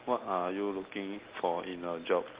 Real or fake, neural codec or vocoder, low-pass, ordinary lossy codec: real; none; 3.6 kHz; Opus, 16 kbps